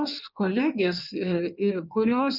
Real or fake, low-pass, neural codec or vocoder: fake; 5.4 kHz; codec, 16 kHz in and 24 kHz out, 1.1 kbps, FireRedTTS-2 codec